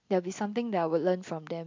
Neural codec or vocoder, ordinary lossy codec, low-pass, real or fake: none; MP3, 64 kbps; 7.2 kHz; real